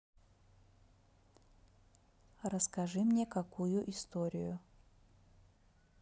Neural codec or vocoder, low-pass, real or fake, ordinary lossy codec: none; none; real; none